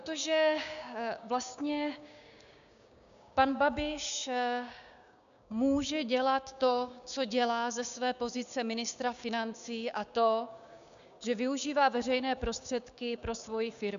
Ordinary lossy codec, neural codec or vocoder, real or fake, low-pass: MP3, 96 kbps; codec, 16 kHz, 6 kbps, DAC; fake; 7.2 kHz